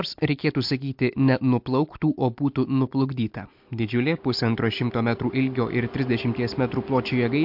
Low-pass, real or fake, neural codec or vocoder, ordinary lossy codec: 5.4 kHz; real; none; MP3, 48 kbps